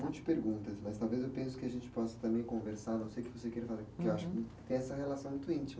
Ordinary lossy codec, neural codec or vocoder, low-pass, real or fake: none; none; none; real